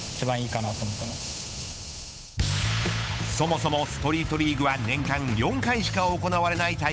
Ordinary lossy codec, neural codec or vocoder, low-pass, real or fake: none; codec, 16 kHz, 8 kbps, FunCodec, trained on Chinese and English, 25 frames a second; none; fake